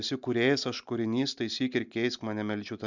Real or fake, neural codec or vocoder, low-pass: real; none; 7.2 kHz